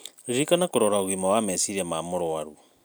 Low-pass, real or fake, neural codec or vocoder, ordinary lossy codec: none; real; none; none